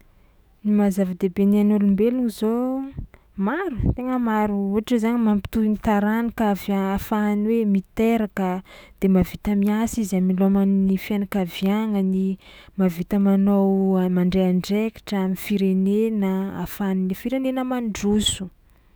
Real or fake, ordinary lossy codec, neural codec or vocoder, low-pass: fake; none; autoencoder, 48 kHz, 128 numbers a frame, DAC-VAE, trained on Japanese speech; none